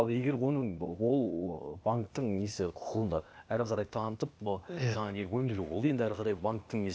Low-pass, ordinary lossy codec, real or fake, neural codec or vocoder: none; none; fake; codec, 16 kHz, 0.8 kbps, ZipCodec